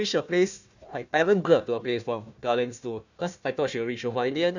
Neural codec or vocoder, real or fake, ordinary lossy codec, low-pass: codec, 16 kHz, 1 kbps, FunCodec, trained on Chinese and English, 50 frames a second; fake; none; 7.2 kHz